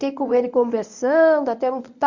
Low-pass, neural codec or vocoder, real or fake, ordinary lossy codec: 7.2 kHz; codec, 24 kHz, 0.9 kbps, WavTokenizer, medium speech release version 1; fake; none